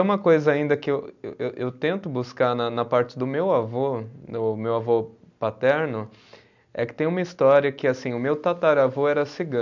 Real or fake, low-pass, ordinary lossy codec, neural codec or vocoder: real; 7.2 kHz; none; none